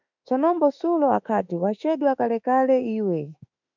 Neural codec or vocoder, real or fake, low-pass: autoencoder, 48 kHz, 32 numbers a frame, DAC-VAE, trained on Japanese speech; fake; 7.2 kHz